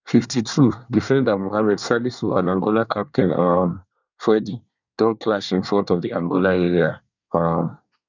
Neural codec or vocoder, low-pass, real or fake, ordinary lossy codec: codec, 24 kHz, 1 kbps, SNAC; 7.2 kHz; fake; none